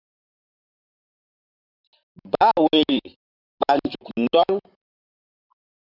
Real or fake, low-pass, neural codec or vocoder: fake; 5.4 kHz; vocoder, 44.1 kHz, 128 mel bands, Pupu-Vocoder